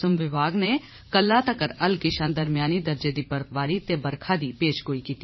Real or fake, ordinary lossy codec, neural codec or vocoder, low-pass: fake; MP3, 24 kbps; vocoder, 44.1 kHz, 80 mel bands, Vocos; 7.2 kHz